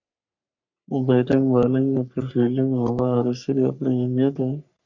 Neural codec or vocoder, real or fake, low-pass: codec, 44.1 kHz, 3.4 kbps, Pupu-Codec; fake; 7.2 kHz